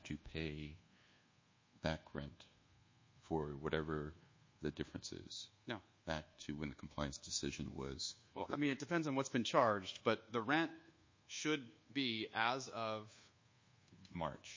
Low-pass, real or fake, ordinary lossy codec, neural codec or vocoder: 7.2 kHz; fake; MP3, 32 kbps; codec, 24 kHz, 1.2 kbps, DualCodec